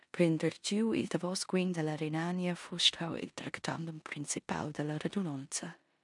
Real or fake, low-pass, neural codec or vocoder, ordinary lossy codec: fake; 10.8 kHz; codec, 16 kHz in and 24 kHz out, 0.9 kbps, LongCat-Audio-Codec, four codebook decoder; MP3, 96 kbps